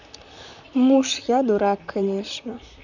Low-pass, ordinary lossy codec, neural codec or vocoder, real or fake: 7.2 kHz; none; vocoder, 44.1 kHz, 128 mel bands, Pupu-Vocoder; fake